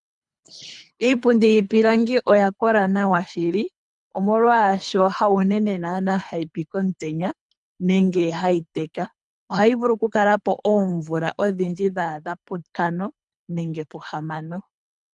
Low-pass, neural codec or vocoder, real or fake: 10.8 kHz; codec, 24 kHz, 3 kbps, HILCodec; fake